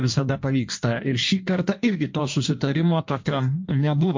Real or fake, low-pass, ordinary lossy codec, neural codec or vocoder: fake; 7.2 kHz; MP3, 48 kbps; codec, 16 kHz in and 24 kHz out, 1.1 kbps, FireRedTTS-2 codec